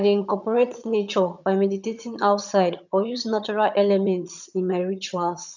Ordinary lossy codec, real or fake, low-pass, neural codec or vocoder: none; fake; 7.2 kHz; vocoder, 22.05 kHz, 80 mel bands, HiFi-GAN